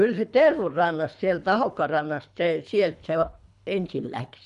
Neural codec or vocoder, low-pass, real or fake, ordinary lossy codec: codec, 24 kHz, 3 kbps, HILCodec; 10.8 kHz; fake; none